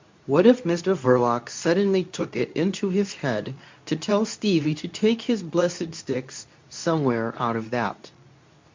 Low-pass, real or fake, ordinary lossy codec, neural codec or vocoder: 7.2 kHz; fake; MP3, 64 kbps; codec, 24 kHz, 0.9 kbps, WavTokenizer, medium speech release version 2